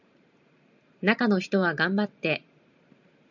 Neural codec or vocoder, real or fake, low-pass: vocoder, 44.1 kHz, 128 mel bands every 512 samples, BigVGAN v2; fake; 7.2 kHz